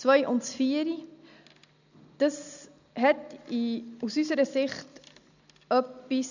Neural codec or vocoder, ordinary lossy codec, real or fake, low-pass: none; none; real; 7.2 kHz